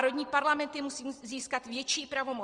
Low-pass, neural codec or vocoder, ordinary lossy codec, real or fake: 9.9 kHz; none; Opus, 24 kbps; real